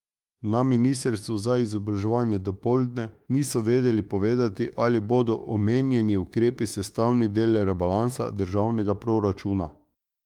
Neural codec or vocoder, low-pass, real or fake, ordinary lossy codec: autoencoder, 48 kHz, 32 numbers a frame, DAC-VAE, trained on Japanese speech; 19.8 kHz; fake; Opus, 32 kbps